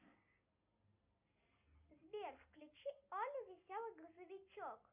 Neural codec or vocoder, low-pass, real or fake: none; 3.6 kHz; real